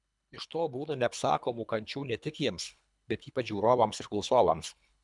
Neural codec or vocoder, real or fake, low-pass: codec, 24 kHz, 3 kbps, HILCodec; fake; 10.8 kHz